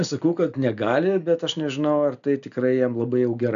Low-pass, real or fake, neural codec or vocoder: 7.2 kHz; real; none